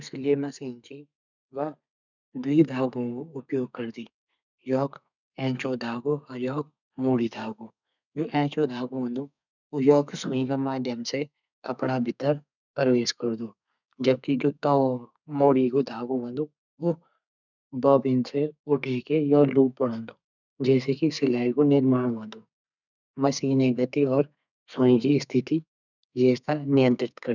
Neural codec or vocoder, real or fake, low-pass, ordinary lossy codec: codec, 32 kHz, 1.9 kbps, SNAC; fake; 7.2 kHz; none